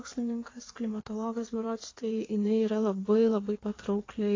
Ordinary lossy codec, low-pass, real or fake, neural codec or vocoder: AAC, 32 kbps; 7.2 kHz; fake; codec, 16 kHz in and 24 kHz out, 1.1 kbps, FireRedTTS-2 codec